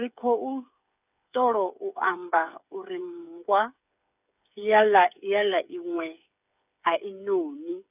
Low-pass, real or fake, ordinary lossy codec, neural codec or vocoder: 3.6 kHz; fake; none; codec, 16 kHz, 8 kbps, FreqCodec, smaller model